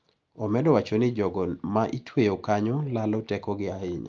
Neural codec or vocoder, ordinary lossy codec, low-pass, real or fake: none; Opus, 32 kbps; 7.2 kHz; real